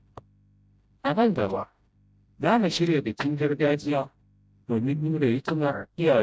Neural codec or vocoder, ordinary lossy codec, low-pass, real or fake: codec, 16 kHz, 0.5 kbps, FreqCodec, smaller model; none; none; fake